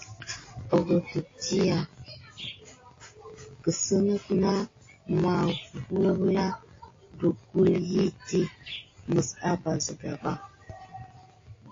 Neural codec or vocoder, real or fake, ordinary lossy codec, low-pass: none; real; AAC, 32 kbps; 7.2 kHz